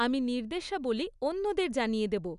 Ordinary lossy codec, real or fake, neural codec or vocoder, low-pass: none; real; none; 10.8 kHz